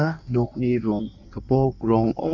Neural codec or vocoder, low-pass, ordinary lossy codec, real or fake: codec, 16 kHz in and 24 kHz out, 1.1 kbps, FireRedTTS-2 codec; 7.2 kHz; none; fake